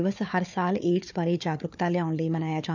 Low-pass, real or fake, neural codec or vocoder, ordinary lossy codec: 7.2 kHz; fake; codec, 16 kHz, 4 kbps, FunCodec, trained on Chinese and English, 50 frames a second; none